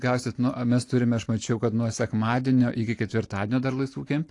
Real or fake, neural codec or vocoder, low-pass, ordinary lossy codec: real; none; 10.8 kHz; AAC, 48 kbps